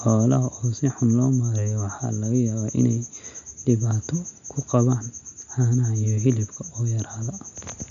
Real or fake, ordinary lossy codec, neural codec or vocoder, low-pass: real; none; none; 7.2 kHz